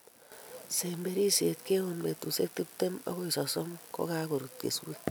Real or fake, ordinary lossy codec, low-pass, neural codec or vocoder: real; none; none; none